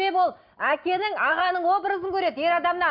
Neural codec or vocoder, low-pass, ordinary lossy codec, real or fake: none; 5.4 kHz; none; real